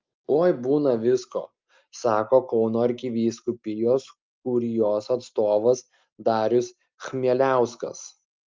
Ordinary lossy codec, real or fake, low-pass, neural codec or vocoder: Opus, 24 kbps; real; 7.2 kHz; none